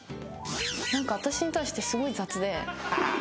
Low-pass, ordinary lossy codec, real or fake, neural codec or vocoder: none; none; real; none